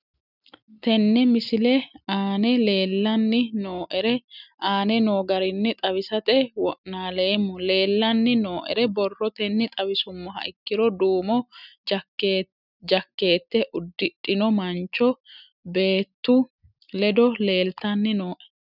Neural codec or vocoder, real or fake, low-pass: none; real; 5.4 kHz